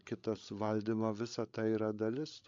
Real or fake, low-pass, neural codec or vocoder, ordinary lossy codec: fake; 7.2 kHz; codec, 16 kHz, 16 kbps, FreqCodec, larger model; MP3, 48 kbps